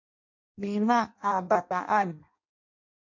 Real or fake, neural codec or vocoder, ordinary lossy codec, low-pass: fake; codec, 16 kHz in and 24 kHz out, 0.6 kbps, FireRedTTS-2 codec; MP3, 64 kbps; 7.2 kHz